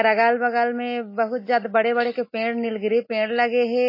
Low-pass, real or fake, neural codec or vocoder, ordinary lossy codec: 5.4 kHz; real; none; MP3, 24 kbps